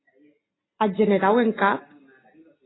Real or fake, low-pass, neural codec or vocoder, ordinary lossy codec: real; 7.2 kHz; none; AAC, 16 kbps